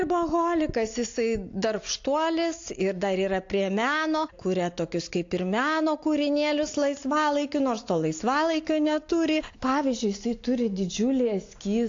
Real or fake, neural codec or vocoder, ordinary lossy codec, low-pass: real; none; AAC, 48 kbps; 7.2 kHz